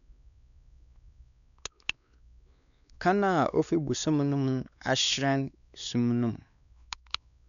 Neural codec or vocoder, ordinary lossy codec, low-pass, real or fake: codec, 16 kHz, 2 kbps, X-Codec, WavLM features, trained on Multilingual LibriSpeech; none; 7.2 kHz; fake